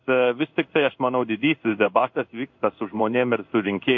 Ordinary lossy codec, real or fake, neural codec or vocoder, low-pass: MP3, 48 kbps; fake; codec, 16 kHz in and 24 kHz out, 1 kbps, XY-Tokenizer; 7.2 kHz